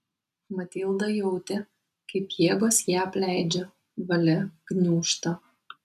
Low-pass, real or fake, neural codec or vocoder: 14.4 kHz; real; none